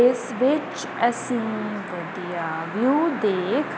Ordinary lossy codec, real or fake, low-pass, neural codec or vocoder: none; real; none; none